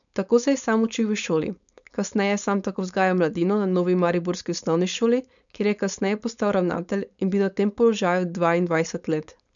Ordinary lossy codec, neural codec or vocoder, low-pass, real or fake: none; codec, 16 kHz, 4.8 kbps, FACodec; 7.2 kHz; fake